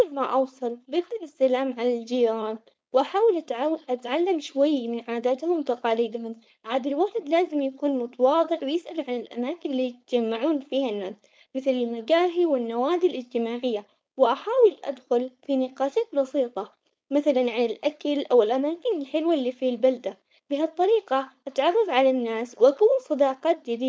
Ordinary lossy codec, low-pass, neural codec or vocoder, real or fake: none; none; codec, 16 kHz, 4.8 kbps, FACodec; fake